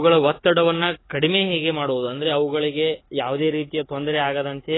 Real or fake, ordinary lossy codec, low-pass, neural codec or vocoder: fake; AAC, 16 kbps; 7.2 kHz; codec, 44.1 kHz, 7.8 kbps, DAC